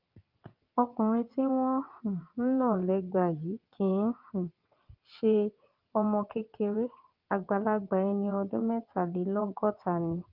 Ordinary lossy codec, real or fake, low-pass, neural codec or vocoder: Opus, 24 kbps; fake; 5.4 kHz; vocoder, 22.05 kHz, 80 mel bands, WaveNeXt